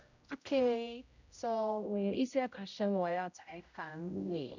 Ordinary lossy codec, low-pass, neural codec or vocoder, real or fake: none; 7.2 kHz; codec, 16 kHz, 0.5 kbps, X-Codec, HuBERT features, trained on general audio; fake